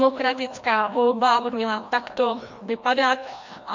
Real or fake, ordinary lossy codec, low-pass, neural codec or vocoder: fake; MP3, 48 kbps; 7.2 kHz; codec, 16 kHz, 1 kbps, FreqCodec, larger model